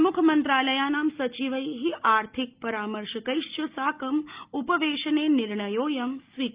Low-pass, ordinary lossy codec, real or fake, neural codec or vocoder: 3.6 kHz; Opus, 24 kbps; real; none